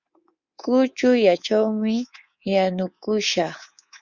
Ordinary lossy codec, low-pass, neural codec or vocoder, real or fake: Opus, 64 kbps; 7.2 kHz; codec, 24 kHz, 3.1 kbps, DualCodec; fake